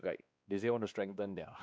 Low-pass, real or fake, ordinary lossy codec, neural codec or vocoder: none; fake; none; codec, 16 kHz, 2 kbps, X-Codec, WavLM features, trained on Multilingual LibriSpeech